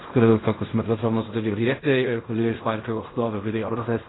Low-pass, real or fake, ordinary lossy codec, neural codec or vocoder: 7.2 kHz; fake; AAC, 16 kbps; codec, 16 kHz in and 24 kHz out, 0.4 kbps, LongCat-Audio-Codec, fine tuned four codebook decoder